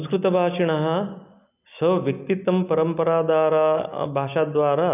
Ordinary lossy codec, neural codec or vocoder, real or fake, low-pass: none; none; real; 3.6 kHz